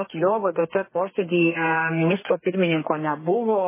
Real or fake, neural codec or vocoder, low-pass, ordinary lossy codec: fake; codec, 32 kHz, 1.9 kbps, SNAC; 3.6 kHz; MP3, 16 kbps